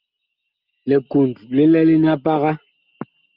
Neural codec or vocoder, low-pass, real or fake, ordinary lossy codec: none; 5.4 kHz; real; Opus, 24 kbps